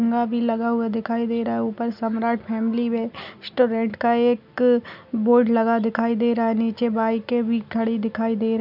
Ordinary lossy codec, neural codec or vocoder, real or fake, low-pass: none; none; real; 5.4 kHz